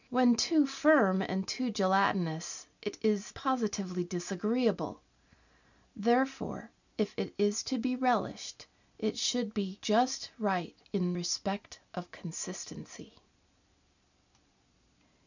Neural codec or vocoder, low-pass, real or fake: none; 7.2 kHz; real